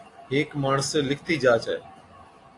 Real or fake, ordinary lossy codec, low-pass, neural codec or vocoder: real; AAC, 48 kbps; 10.8 kHz; none